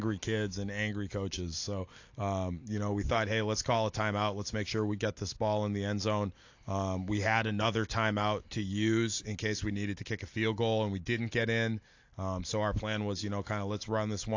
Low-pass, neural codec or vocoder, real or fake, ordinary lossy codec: 7.2 kHz; none; real; AAC, 48 kbps